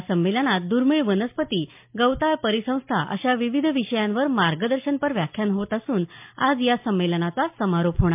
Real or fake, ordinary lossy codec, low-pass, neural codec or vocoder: real; MP3, 32 kbps; 3.6 kHz; none